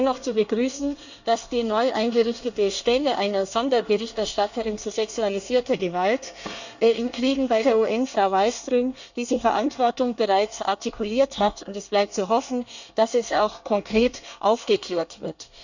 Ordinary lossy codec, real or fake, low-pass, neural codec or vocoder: none; fake; 7.2 kHz; codec, 24 kHz, 1 kbps, SNAC